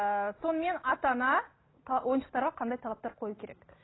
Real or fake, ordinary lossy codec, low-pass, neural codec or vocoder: real; AAC, 16 kbps; 7.2 kHz; none